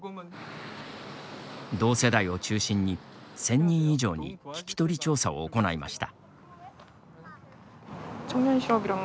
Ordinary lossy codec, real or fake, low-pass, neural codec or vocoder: none; real; none; none